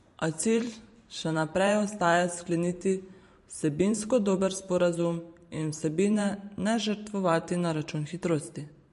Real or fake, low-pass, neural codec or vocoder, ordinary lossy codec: fake; 14.4 kHz; vocoder, 44.1 kHz, 128 mel bands every 512 samples, BigVGAN v2; MP3, 48 kbps